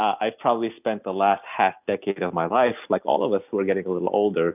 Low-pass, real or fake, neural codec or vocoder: 3.6 kHz; real; none